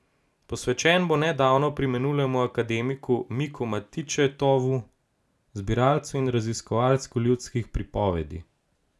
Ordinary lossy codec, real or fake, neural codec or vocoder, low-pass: none; real; none; none